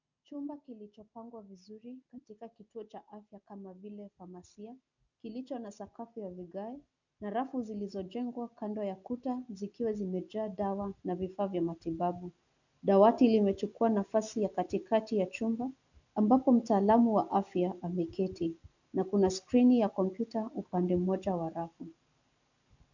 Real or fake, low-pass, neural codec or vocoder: real; 7.2 kHz; none